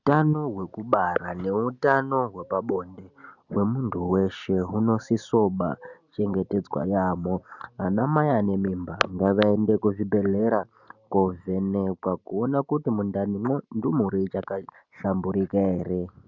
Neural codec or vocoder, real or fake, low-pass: none; real; 7.2 kHz